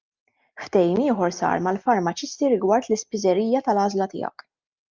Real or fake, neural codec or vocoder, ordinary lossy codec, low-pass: real; none; Opus, 24 kbps; 7.2 kHz